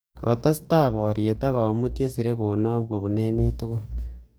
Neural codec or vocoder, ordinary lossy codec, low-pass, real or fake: codec, 44.1 kHz, 2.6 kbps, DAC; none; none; fake